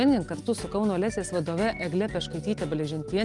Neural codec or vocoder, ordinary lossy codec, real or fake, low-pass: none; Opus, 32 kbps; real; 10.8 kHz